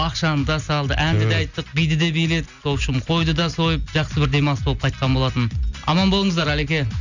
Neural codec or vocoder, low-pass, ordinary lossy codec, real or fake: none; 7.2 kHz; none; real